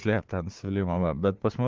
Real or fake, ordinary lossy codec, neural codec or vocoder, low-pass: fake; Opus, 16 kbps; codec, 16 kHz, 4 kbps, FunCodec, trained on Chinese and English, 50 frames a second; 7.2 kHz